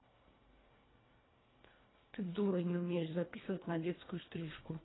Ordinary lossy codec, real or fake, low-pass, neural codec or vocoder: AAC, 16 kbps; fake; 7.2 kHz; codec, 24 kHz, 1.5 kbps, HILCodec